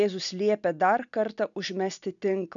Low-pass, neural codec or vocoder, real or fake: 7.2 kHz; none; real